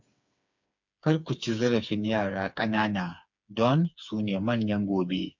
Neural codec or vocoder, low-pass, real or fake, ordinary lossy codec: codec, 16 kHz, 4 kbps, FreqCodec, smaller model; 7.2 kHz; fake; MP3, 64 kbps